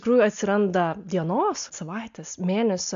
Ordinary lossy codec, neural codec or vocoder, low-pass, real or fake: AAC, 64 kbps; none; 7.2 kHz; real